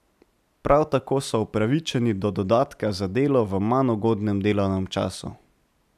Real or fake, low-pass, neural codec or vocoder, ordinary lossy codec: real; 14.4 kHz; none; none